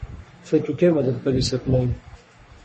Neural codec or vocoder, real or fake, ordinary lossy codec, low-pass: codec, 44.1 kHz, 3.4 kbps, Pupu-Codec; fake; MP3, 32 kbps; 10.8 kHz